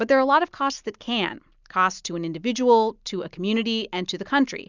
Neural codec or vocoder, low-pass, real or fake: none; 7.2 kHz; real